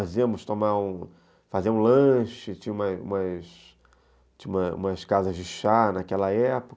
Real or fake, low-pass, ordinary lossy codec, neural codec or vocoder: real; none; none; none